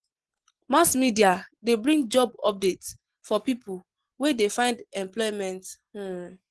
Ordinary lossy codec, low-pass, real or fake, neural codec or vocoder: Opus, 16 kbps; 10.8 kHz; real; none